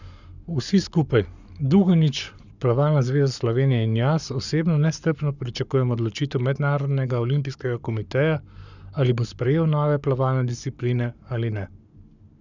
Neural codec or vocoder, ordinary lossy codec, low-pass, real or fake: codec, 44.1 kHz, 7.8 kbps, Pupu-Codec; none; 7.2 kHz; fake